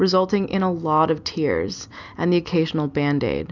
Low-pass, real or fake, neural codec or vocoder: 7.2 kHz; real; none